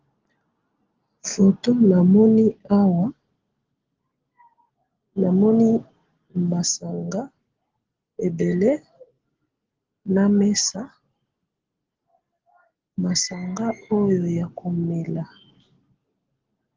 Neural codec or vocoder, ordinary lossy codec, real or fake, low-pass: none; Opus, 16 kbps; real; 7.2 kHz